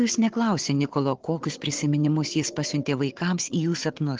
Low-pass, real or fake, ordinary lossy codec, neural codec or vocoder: 7.2 kHz; fake; Opus, 16 kbps; codec, 16 kHz, 4 kbps, FunCodec, trained on Chinese and English, 50 frames a second